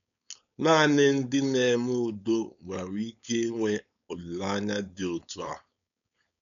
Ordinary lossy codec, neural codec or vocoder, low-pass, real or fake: none; codec, 16 kHz, 4.8 kbps, FACodec; 7.2 kHz; fake